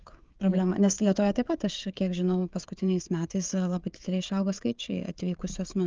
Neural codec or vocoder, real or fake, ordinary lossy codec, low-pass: codec, 16 kHz, 16 kbps, FreqCodec, smaller model; fake; Opus, 24 kbps; 7.2 kHz